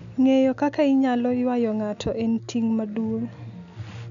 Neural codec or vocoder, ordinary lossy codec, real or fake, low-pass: none; none; real; 7.2 kHz